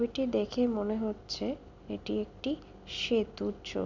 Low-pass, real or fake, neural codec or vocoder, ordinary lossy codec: 7.2 kHz; real; none; none